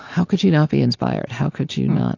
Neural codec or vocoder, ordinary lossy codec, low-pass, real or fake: none; AAC, 48 kbps; 7.2 kHz; real